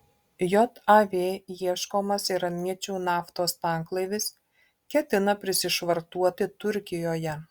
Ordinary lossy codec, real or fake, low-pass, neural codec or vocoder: Opus, 64 kbps; real; 19.8 kHz; none